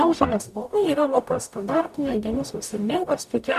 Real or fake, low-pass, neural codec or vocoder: fake; 14.4 kHz; codec, 44.1 kHz, 0.9 kbps, DAC